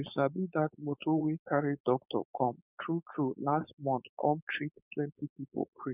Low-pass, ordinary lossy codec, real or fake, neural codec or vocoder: 3.6 kHz; none; fake; codec, 16 kHz, 4.8 kbps, FACodec